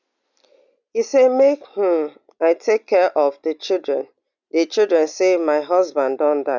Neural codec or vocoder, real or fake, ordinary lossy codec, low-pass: none; real; none; 7.2 kHz